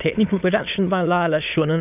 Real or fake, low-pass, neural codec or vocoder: fake; 3.6 kHz; autoencoder, 22.05 kHz, a latent of 192 numbers a frame, VITS, trained on many speakers